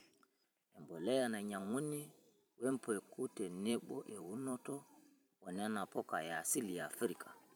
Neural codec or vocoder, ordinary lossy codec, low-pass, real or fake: vocoder, 44.1 kHz, 128 mel bands every 512 samples, BigVGAN v2; none; none; fake